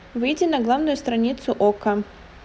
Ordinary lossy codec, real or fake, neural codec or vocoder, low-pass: none; real; none; none